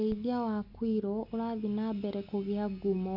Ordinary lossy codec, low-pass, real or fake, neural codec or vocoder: none; 5.4 kHz; real; none